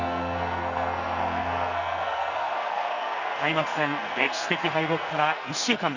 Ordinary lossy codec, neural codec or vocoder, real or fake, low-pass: none; codec, 44.1 kHz, 2.6 kbps, SNAC; fake; 7.2 kHz